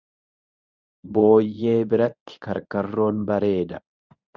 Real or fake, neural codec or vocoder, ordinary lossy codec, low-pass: fake; codec, 24 kHz, 0.9 kbps, WavTokenizer, medium speech release version 1; Opus, 64 kbps; 7.2 kHz